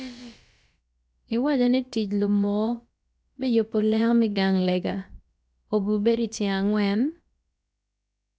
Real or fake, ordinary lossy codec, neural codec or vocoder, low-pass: fake; none; codec, 16 kHz, about 1 kbps, DyCAST, with the encoder's durations; none